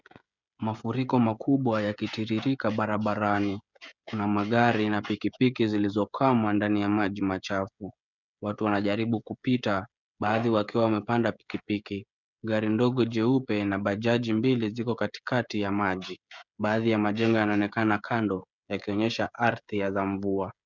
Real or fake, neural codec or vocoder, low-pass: fake; codec, 16 kHz, 16 kbps, FreqCodec, smaller model; 7.2 kHz